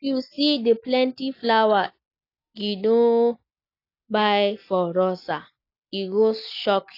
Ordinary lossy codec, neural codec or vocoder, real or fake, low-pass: AAC, 32 kbps; none; real; 5.4 kHz